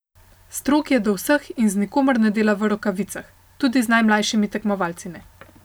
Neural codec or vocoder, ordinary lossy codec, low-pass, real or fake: none; none; none; real